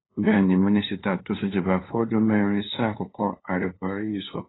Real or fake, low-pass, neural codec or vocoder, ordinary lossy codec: fake; 7.2 kHz; codec, 16 kHz, 2 kbps, FunCodec, trained on LibriTTS, 25 frames a second; AAC, 16 kbps